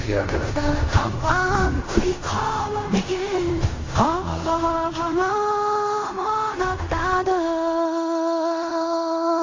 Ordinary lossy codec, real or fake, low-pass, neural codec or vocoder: AAC, 32 kbps; fake; 7.2 kHz; codec, 16 kHz in and 24 kHz out, 0.4 kbps, LongCat-Audio-Codec, fine tuned four codebook decoder